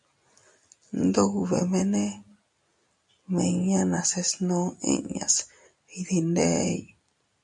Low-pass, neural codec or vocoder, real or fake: 10.8 kHz; none; real